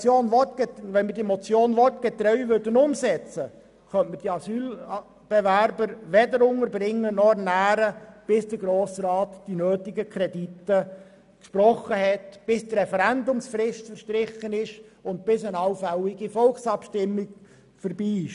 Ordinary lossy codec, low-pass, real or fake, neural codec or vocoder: none; 10.8 kHz; real; none